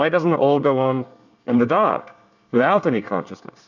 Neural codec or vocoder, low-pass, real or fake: codec, 24 kHz, 1 kbps, SNAC; 7.2 kHz; fake